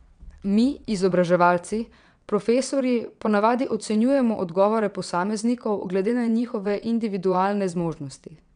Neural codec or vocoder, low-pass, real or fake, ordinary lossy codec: vocoder, 22.05 kHz, 80 mel bands, WaveNeXt; 9.9 kHz; fake; none